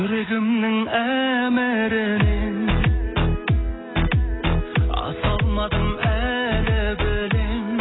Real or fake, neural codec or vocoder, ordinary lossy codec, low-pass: real; none; AAC, 16 kbps; 7.2 kHz